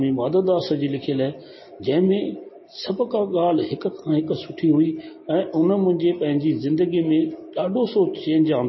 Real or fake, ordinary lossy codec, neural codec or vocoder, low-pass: real; MP3, 24 kbps; none; 7.2 kHz